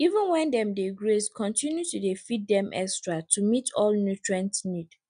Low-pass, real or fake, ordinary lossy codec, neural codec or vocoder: 9.9 kHz; real; none; none